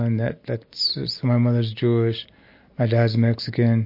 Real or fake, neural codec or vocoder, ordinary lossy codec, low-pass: real; none; MP3, 32 kbps; 5.4 kHz